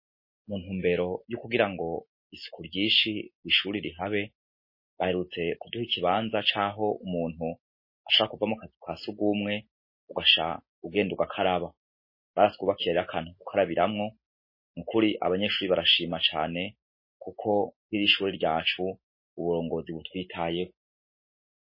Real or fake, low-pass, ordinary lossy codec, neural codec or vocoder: real; 5.4 kHz; MP3, 24 kbps; none